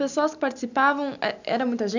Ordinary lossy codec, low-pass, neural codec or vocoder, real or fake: none; 7.2 kHz; none; real